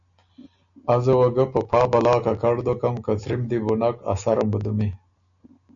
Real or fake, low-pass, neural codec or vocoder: real; 7.2 kHz; none